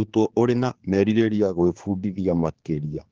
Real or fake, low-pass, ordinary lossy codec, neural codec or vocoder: fake; 7.2 kHz; Opus, 16 kbps; codec, 16 kHz, 4 kbps, X-Codec, HuBERT features, trained on general audio